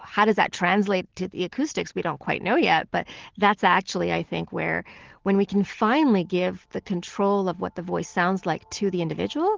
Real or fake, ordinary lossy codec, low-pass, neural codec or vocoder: real; Opus, 16 kbps; 7.2 kHz; none